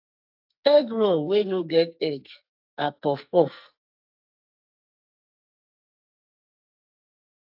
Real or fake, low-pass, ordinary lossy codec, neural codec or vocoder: fake; 5.4 kHz; AAC, 48 kbps; codec, 44.1 kHz, 2.6 kbps, SNAC